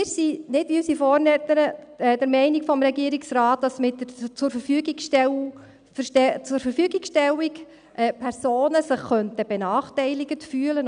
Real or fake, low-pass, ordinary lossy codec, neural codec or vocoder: real; 9.9 kHz; none; none